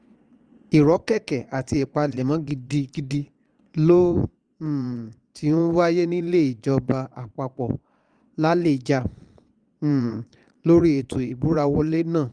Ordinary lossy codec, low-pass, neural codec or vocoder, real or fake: Opus, 32 kbps; 9.9 kHz; vocoder, 22.05 kHz, 80 mel bands, Vocos; fake